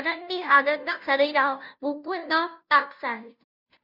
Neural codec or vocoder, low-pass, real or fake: codec, 16 kHz, 0.5 kbps, FunCodec, trained on Chinese and English, 25 frames a second; 5.4 kHz; fake